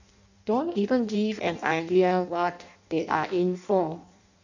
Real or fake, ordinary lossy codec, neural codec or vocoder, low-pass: fake; none; codec, 16 kHz in and 24 kHz out, 0.6 kbps, FireRedTTS-2 codec; 7.2 kHz